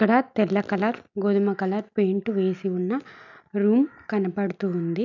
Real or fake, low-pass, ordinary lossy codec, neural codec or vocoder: real; 7.2 kHz; none; none